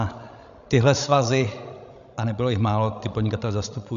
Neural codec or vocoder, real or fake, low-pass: codec, 16 kHz, 16 kbps, FreqCodec, larger model; fake; 7.2 kHz